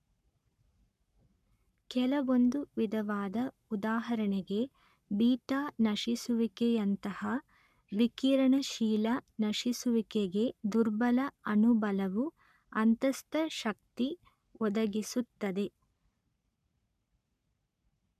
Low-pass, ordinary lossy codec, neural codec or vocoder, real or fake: 14.4 kHz; none; codec, 44.1 kHz, 7.8 kbps, Pupu-Codec; fake